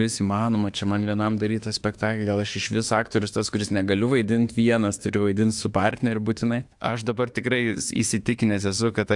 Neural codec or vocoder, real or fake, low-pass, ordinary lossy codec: autoencoder, 48 kHz, 32 numbers a frame, DAC-VAE, trained on Japanese speech; fake; 10.8 kHz; AAC, 64 kbps